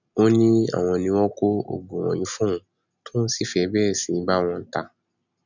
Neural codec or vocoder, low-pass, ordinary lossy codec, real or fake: none; 7.2 kHz; none; real